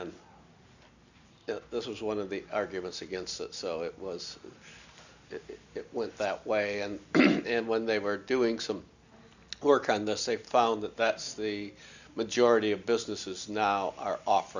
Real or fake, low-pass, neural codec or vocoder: real; 7.2 kHz; none